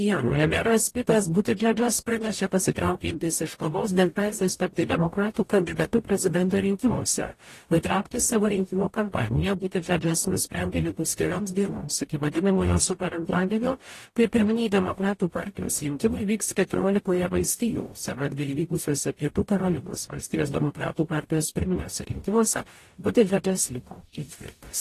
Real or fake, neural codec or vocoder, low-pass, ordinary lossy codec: fake; codec, 44.1 kHz, 0.9 kbps, DAC; 14.4 kHz; AAC, 48 kbps